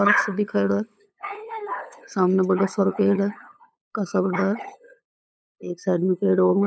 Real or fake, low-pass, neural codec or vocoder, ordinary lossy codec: fake; none; codec, 16 kHz, 8 kbps, FunCodec, trained on LibriTTS, 25 frames a second; none